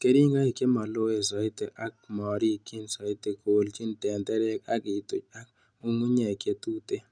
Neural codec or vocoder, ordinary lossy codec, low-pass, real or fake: none; none; 9.9 kHz; real